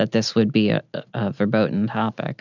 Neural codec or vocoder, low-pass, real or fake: none; 7.2 kHz; real